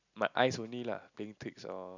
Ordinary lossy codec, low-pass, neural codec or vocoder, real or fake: none; 7.2 kHz; none; real